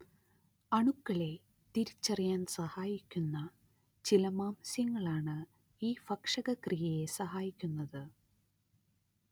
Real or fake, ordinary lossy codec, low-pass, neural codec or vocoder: real; none; none; none